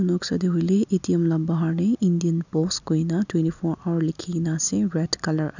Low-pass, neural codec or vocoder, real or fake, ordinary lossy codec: 7.2 kHz; none; real; none